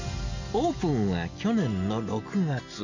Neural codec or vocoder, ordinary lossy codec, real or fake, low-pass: none; none; real; 7.2 kHz